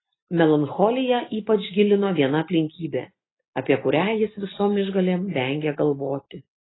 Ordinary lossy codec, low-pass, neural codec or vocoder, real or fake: AAC, 16 kbps; 7.2 kHz; none; real